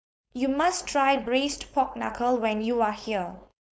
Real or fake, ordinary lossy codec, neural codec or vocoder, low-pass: fake; none; codec, 16 kHz, 4.8 kbps, FACodec; none